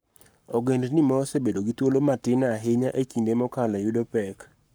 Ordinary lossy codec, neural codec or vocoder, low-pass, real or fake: none; codec, 44.1 kHz, 7.8 kbps, Pupu-Codec; none; fake